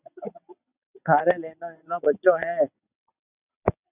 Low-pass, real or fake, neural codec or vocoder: 3.6 kHz; fake; vocoder, 22.05 kHz, 80 mel bands, WaveNeXt